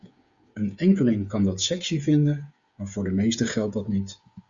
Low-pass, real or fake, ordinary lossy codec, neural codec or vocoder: 7.2 kHz; fake; Opus, 64 kbps; codec, 16 kHz, 8 kbps, FreqCodec, smaller model